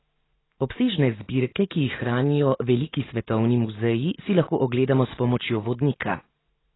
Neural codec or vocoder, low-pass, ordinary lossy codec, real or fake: none; 7.2 kHz; AAC, 16 kbps; real